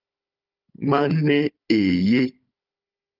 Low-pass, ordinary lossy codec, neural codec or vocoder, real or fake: 5.4 kHz; Opus, 24 kbps; codec, 16 kHz, 16 kbps, FunCodec, trained on Chinese and English, 50 frames a second; fake